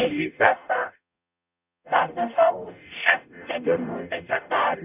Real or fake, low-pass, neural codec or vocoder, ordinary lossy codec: fake; 3.6 kHz; codec, 44.1 kHz, 0.9 kbps, DAC; none